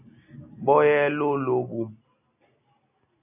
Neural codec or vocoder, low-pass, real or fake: none; 3.6 kHz; real